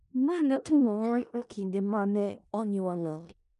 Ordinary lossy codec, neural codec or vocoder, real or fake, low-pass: none; codec, 16 kHz in and 24 kHz out, 0.4 kbps, LongCat-Audio-Codec, four codebook decoder; fake; 10.8 kHz